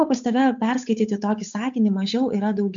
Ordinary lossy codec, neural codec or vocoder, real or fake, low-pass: MP3, 64 kbps; codec, 16 kHz, 8 kbps, FunCodec, trained on Chinese and English, 25 frames a second; fake; 7.2 kHz